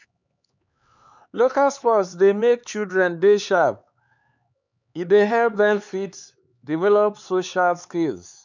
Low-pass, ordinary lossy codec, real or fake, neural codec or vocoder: 7.2 kHz; none; fake; codec, 16 kHz, 4 kbps, X-Codec, HuBERT features, trained on LibriSpeech